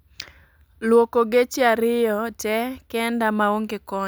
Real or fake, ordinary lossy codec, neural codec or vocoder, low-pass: real; none; none; none